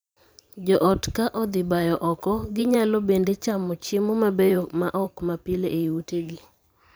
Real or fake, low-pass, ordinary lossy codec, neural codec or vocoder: fake; none; none; vocoder, 44.1 kHz, 128 mel bands every 512 samples, BigVGAN v2